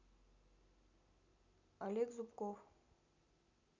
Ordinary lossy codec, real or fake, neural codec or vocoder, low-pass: none; real; none; 7.2 kHz